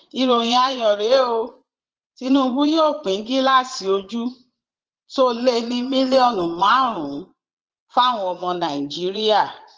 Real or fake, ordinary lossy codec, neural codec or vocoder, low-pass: fake; Opus, 16 kbps; vocoder, 22.05 kHz, 80 mel bands, Vocos; 7.2 kHz